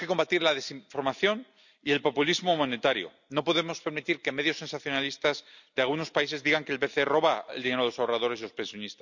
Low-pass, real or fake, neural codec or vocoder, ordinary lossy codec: 7.2 kHz; real; none; none